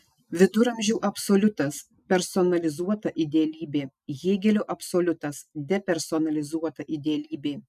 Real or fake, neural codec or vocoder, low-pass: real; none; 14.4 kHz